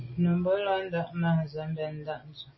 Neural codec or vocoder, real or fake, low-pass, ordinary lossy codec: none; real; 7.2 kHz; MP3, 24 kbps